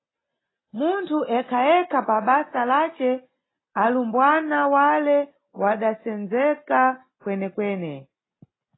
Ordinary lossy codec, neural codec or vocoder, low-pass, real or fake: AAC, 16 kbps; none; 7.2 kHz; real